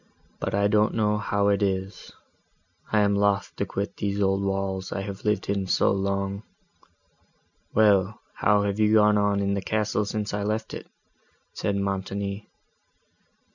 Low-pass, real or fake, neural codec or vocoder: 7.2 kHz; real; none